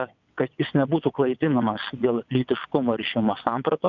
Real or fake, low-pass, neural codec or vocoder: fake; 7.2 kHz; vocoder, 22.05 kHz, 80 mel bands, WaveNeXt